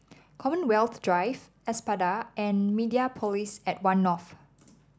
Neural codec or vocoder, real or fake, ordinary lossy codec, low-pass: none; real; none; none